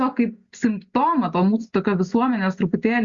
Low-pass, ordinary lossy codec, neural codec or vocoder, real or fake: 7.2 kHz; Opus, 64 kbps; none; real